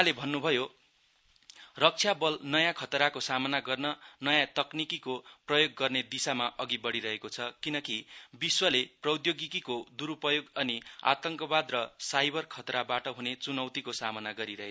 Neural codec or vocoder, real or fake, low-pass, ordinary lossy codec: none; real; none; none